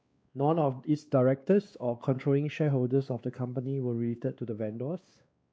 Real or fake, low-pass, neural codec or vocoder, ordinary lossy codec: fake; none; codec, 16 kHz, 2 kbps, X-Codec, WavLM features, trained on Multilingual LibriSpeech; none